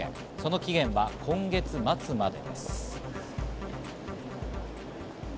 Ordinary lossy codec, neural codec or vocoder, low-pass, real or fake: none; none; none; real